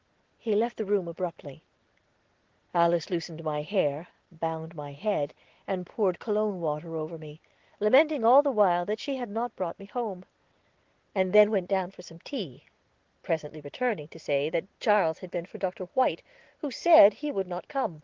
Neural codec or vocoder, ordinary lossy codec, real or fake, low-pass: none; Opus, 16 kbps; real; 7.2 kHz